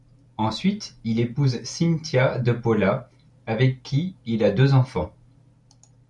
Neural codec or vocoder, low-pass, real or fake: none; 10.8 kHz; real